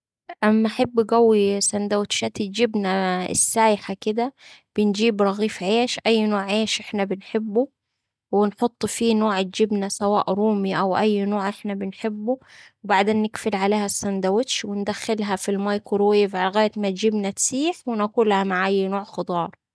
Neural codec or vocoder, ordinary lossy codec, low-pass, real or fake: none; none; none; real